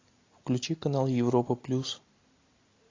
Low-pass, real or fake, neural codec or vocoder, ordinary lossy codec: 7.2 kHz; real; none; AAC, 32 kbps